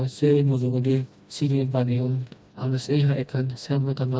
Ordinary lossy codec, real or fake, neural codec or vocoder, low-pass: none; fake; codec, 16 kHz, 1 kbps, FreqCodec, smaller model; none